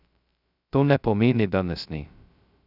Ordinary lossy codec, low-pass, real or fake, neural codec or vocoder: none; 5.4 kHz; fake; codec, 16 kHz, 0.2 kbps, FocalCodec